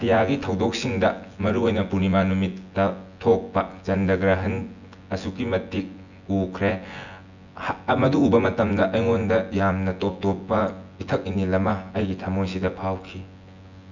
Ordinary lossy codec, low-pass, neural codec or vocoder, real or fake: none; 7.2 kHz; vocoder, 24 kHz, 100 mel bands, Vocos; fake